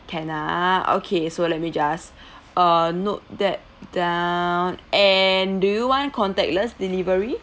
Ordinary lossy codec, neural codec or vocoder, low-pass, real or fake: none; none; none; real